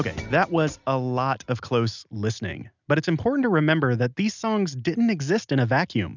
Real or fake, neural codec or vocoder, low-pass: real; none; 7.2 kHz